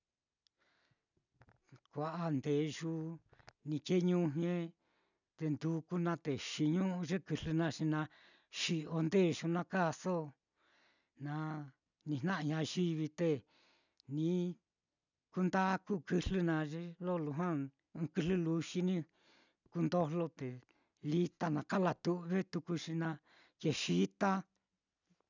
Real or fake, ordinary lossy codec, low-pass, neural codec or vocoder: real; none; 7.2 kHz; none